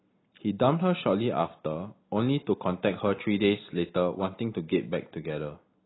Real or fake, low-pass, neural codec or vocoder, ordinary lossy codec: real; 7.2 kHz; none; AAC, 16 kbps